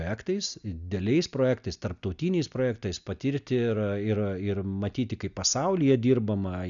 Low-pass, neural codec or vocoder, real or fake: 7.2 kHz; none; real